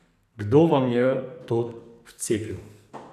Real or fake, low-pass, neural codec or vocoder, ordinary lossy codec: fake; 14.4 kHz; codec, 44.1 kHz, 2.6 kbps, SNAC; none